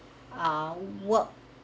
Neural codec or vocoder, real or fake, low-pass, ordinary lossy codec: none; real; none; none